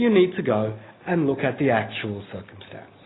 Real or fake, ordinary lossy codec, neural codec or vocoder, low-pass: real; AAC, 16 kbps; none; 7.2 kHz